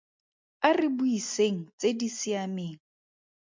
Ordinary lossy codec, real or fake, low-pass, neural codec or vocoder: MP3, 64 kbps; real; 7.2 kHz; none